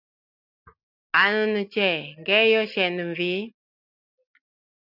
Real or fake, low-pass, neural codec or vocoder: real; 5.4 kHz; none